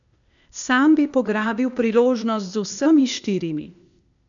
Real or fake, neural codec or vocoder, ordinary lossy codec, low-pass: fake; codec, 16 kHz, 0.8 kbps, ZipCodec; none; 7.2 kHz